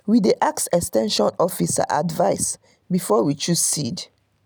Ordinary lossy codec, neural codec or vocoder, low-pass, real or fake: none; none; none; real